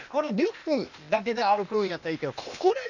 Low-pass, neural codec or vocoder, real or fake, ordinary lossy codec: 7.2 kHz; codec, 16 kHz, 0.8 kbps, ZipCodec; fake; none